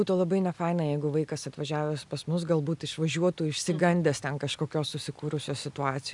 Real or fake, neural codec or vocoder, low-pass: real; none; 10.8 kHz